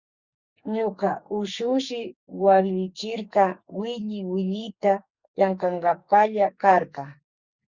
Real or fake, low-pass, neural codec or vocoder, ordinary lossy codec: fake; 7.2 kHz; codec, 44.1 kHz, 2.6 kbps, SNAC; Opus, 64 kbps